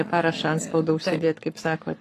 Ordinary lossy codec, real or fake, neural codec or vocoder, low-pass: AAC, 48 kbps; fake; codec, 44.1 kHz, 7.8 kbps, Pupu-Codec; 14.4 kHz